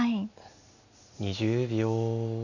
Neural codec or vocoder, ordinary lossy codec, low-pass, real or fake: none; none; 7.2 kHz; real